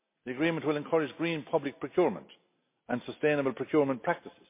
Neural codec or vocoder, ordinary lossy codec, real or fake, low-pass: none; MP3, 24 kbps; real; 3.6 kHz